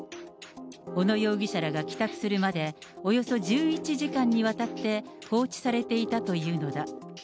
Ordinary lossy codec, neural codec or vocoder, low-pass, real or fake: none; none; none; real